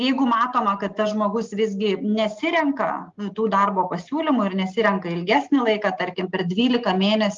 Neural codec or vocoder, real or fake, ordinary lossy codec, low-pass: none; real; Opus, 24 kbps; 7.2 kHz